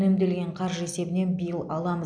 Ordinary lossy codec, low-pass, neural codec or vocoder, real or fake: none; 9.9 kHz; none; real